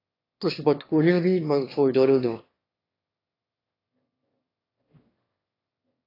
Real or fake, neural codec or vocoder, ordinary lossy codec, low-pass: fake; autoencoder, 22.05 kHz, a latent of 192 numbers a frame, VITS, trained on one speaker; AAC, 24 kbps; 5.4 kHz